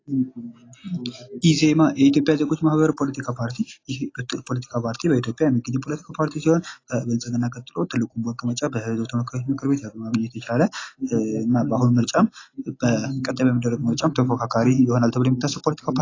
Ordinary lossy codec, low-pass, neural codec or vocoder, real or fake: AAC, 32 kbps; 7.2 kHz; none; real